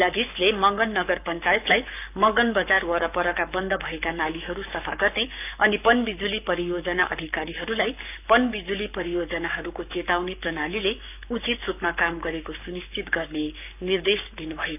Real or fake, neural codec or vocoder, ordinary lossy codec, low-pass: fake; codec, 44.1 kHz, 7.8 kbps, Pupu-Codec; none; 3.6 kHz